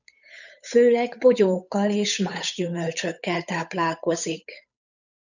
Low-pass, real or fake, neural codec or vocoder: 7.2 kHz; fake; codec, 16 kHz, 8 kbps, FunCodec, trained on Chinese and English, 25 frames a second